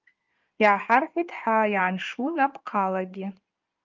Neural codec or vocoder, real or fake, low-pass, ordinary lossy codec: codec, 16 kHz in and 24 kHz out, 2.2 kbps, FireRedTTS-2 codec; fake; 7.2 kHz; Opus, 24 kbps